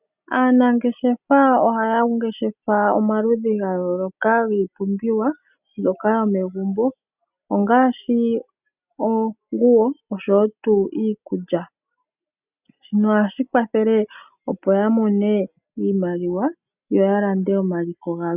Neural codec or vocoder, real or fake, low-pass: none; real; 3.6 kHz